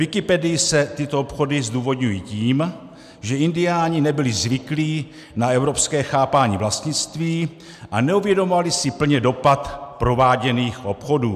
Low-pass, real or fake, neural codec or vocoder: 14.4 kHz; real; none